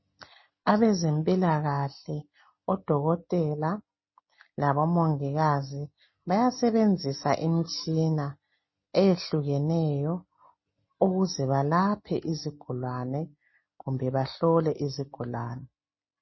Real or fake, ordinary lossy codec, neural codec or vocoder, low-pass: real; MP3, 24 kbps; none; 7.2 kHz